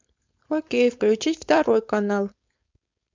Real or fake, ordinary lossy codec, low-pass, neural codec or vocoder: fake; MP3, 64 kbps; 7.2 kHz; codec, 16 kHz, 4.8 kbps, FACodec